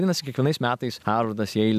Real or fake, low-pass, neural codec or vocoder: real; 14.4 kHz; none